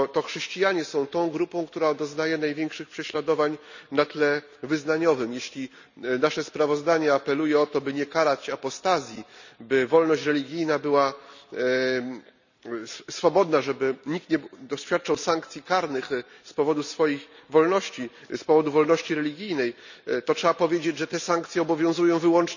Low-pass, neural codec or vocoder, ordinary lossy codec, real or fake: 7.2 kHz; none; none; real